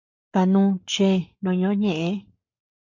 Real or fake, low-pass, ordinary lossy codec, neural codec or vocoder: fake; 7.2 kHz; MP3, 48 kbps; codec, 44.1 kHz, 7.8 kbps, Pupu-Codec